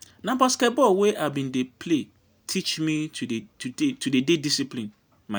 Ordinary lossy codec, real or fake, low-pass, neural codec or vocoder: none; real; none; none